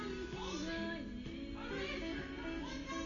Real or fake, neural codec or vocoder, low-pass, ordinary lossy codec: real; none; 7.2 kHz; MP3, 64 kbps